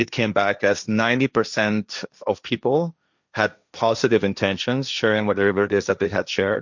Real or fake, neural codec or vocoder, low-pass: fake; codec, 16 kHz, 1.1 kbps, Voila-Tokenizer; 7.2 kHz